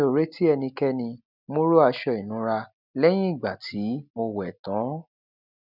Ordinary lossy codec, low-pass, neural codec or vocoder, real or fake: none; 5.4 kHz; none; real